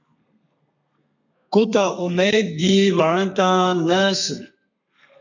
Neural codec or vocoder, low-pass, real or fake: codec, 32 kHz, 1.9 kbps, SNAC; 7.2 kHz; fake